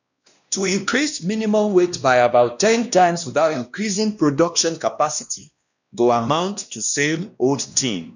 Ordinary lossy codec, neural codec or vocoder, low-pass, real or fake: none; codec, 16 kHz, 1 kbps, X-Codec, WavLM features, trained on Multilingual LibriSpeech; 7.2 kHz; fake